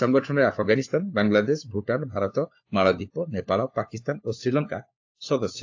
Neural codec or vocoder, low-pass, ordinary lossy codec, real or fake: codec, 16 kHz, 4 kbps, FunCodec, trained on LibriTTS, 50 frames a second; 7.2 kHz; AAC, 48 kbps; fake